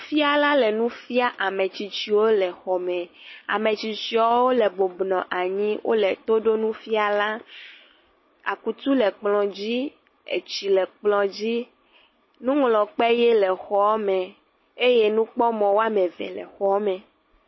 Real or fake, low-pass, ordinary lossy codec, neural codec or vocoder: real; 7.2 kHz; MP3, 24 kbps; none